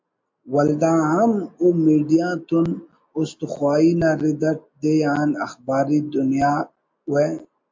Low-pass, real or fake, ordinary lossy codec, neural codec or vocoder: 7.2 kHz; real; MP3, 48 kbps; none